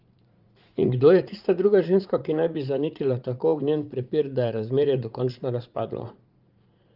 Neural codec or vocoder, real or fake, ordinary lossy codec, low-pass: vocoder, 44.1 kHz, 80 mel bands, Vocos; fake; Opus, 24 kbps; 5.4 kHz